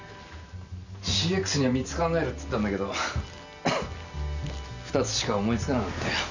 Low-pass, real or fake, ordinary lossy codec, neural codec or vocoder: 7.2 kHz; real; none; none